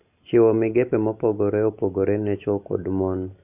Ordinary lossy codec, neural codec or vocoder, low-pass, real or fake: none; none; 3.6 kHz; real